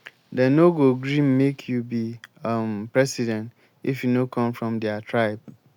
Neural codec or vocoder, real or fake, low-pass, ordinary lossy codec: none; real; 19.8 kHz; none